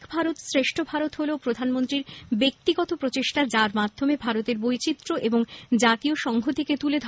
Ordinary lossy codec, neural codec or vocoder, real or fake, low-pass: none; none; real; none